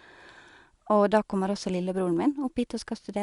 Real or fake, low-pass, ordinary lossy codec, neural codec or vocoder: real; 10.8 kHz; Opus, 64 kbps; none